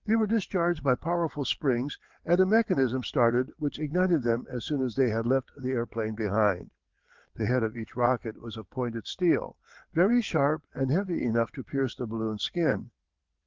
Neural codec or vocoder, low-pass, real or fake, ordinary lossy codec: vocoder, 22.05 kHz, 80 mel bands, WaveNeXt; 7.2 kHz; fake; Opus, 16 kbps